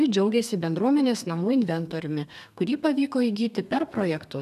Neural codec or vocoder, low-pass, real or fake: codec, 32 kHz, 1.9 kbps, SNAC; 14.4 kHz; fake